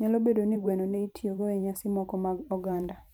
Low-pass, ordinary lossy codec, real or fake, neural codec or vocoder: none; none; fake; vocoder, 44.1 kHz, 128 mel bands every 512 samples, BigVGAN v2